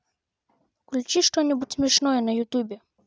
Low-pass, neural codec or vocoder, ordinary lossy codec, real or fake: none; none; none; real